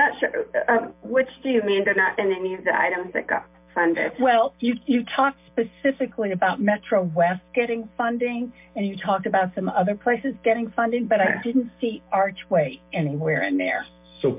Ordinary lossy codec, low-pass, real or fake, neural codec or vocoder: MP3, 32 kbps; 3.6 kHz; real; none